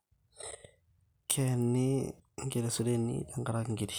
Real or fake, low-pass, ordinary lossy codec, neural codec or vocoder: real; none; none; none